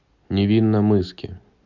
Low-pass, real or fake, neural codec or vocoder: 7.2 kHz; real; none